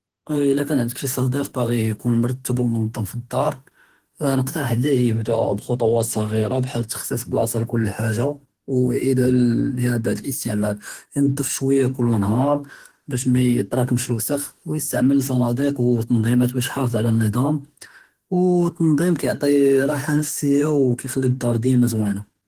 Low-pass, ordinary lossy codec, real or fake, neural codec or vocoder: 14.4 kHz; Opus, 16 kbps; fake; autoencoder, 48 kHz, 32 numbers a frame, DAC-VAE, trained on Japanese speech